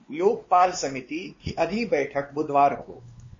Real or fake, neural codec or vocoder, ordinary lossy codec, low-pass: fake; codec, 16 kHz, 2 kbps, X-Codec, WavLM features, trained on Multilingual LibriSpeech; MP3, 32 kbps; 7.2 kHz